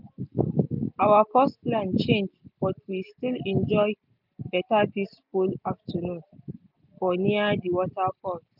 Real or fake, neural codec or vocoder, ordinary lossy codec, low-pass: real; none; none; 5.4 kHz